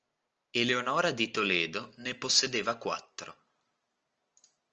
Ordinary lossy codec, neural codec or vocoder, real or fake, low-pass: Opus, 24 kbps; none; real; 7.2 kHz